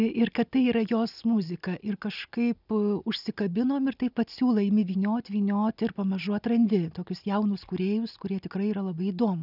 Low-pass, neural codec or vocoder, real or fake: 5.4 kHz; none; real